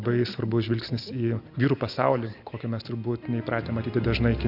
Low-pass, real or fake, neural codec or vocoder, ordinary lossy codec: 5.4 kHz; real; none; AAC, 48 kbps